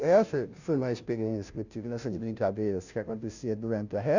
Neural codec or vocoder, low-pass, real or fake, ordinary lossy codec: codec, 16 kHz, 0.5 kbps, FunCodec, trained on Chinese and English, 25 frames a second; 7.2 kHz; fake; none